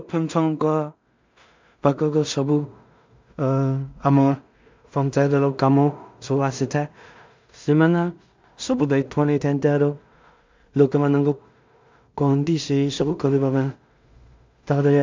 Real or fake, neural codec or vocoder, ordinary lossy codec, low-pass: fake; codec, 16 kHz in and 24 kHz out, 0.4 kbps, LongCat-Audio-Codec, two codebook decoder; MP3, 64 kbps; 7.2 kHz